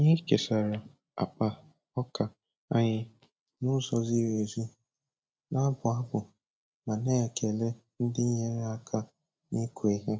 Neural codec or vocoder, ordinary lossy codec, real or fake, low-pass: none; none; real; none